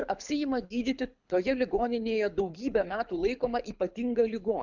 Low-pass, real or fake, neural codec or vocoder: 7.2 kHz; fake; codec, 24 kHz, 6 kbps, HILCodec